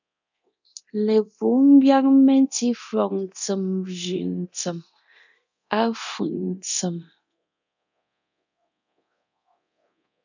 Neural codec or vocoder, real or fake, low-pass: codec, 24 kHz, 0.9 kbps, DualCodec; fake; 7.2 kHz